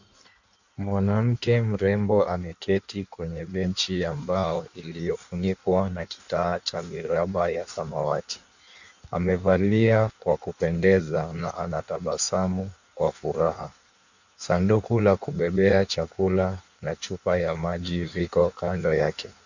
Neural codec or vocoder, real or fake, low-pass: codec, 16 kHz in and 24 kHz out, 1.1 kbps, FireRedTTS-2 codec; fake; 7.2 kHz